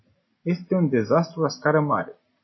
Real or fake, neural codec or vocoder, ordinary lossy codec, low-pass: real; none; MP3, 24 kbps; 7.2 kHz